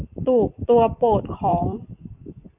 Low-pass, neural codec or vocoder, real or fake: 3.6 kHz; vocoder, 44.1 kHz, 128 mel bands every 256 samples, BigVGAN v2; fake